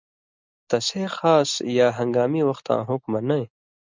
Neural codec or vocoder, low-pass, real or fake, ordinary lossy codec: none; 7.2 kHz; real; MP3, 64 kbps